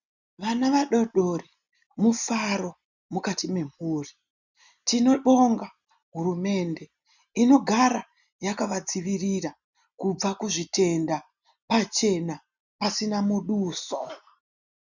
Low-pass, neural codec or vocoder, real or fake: 7.2 kHz; none; real